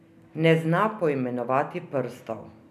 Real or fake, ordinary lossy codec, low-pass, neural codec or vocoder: real; none; 14.4 kHz; none